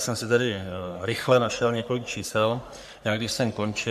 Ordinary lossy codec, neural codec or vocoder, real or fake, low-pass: MP3, 96 kbps; codec, 44.1 kHz, 3.4 kbps, Pupu-Codec; fake; 14.4 kHz